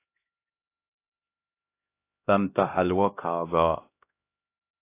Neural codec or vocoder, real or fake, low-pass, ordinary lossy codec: codec, 16 kHz, 1 kbps, X-Codec, HuBERT features, trained on LibriSpeech; fake; 3.6 kHz; AAC, 24 kbps